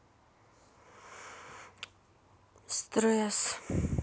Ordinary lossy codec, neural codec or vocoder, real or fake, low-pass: none; none; real; none